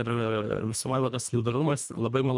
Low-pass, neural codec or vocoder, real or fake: 10.8 kHz; codec, 24 kHz, 1.5 kbps, HILCodec; fake